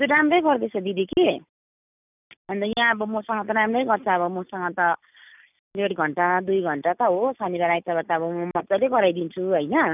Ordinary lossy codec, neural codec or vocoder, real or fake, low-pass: none; none; real; 3.6 kHz